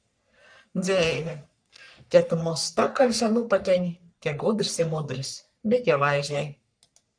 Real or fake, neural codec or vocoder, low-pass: fake; codec, 44.1 kHz, 3.4 kbps, Pupu-Codec; 9.9 kHz